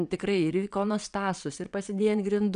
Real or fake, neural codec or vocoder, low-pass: fake; vocoder, 22.05 kHz, 80 mel bands, WaveNeXt; 9.9 kHz